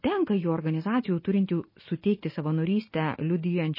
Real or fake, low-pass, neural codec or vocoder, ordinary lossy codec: real; 5.4 kHz; none; MP3, 24 kbps